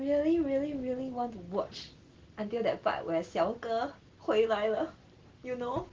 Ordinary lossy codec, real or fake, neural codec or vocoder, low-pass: Opus, 16 kbps; real; none; 7.2 kHz